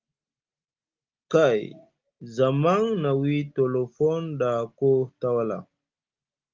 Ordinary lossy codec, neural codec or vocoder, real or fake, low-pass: Opus, 32 kbps; none; real; 7.2 kHz